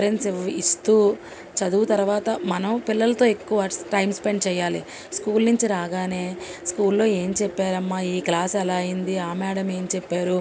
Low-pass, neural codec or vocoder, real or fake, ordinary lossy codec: none; none; real; none